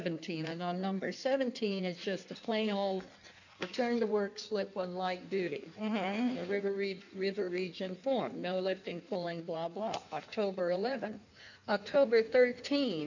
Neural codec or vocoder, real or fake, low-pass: codec, 16 kHz in and 24 kHz out, 1.1 kbps, FireRedTTS-2 codec; fake; 7.2 kHz